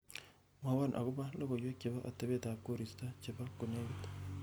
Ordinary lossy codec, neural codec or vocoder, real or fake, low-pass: none; none; real; none